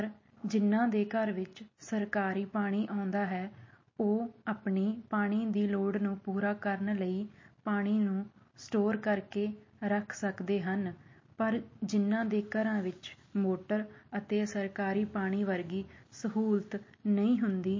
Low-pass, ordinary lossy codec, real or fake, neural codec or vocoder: 7.2 kHz; MP3, 32 kbps; real; none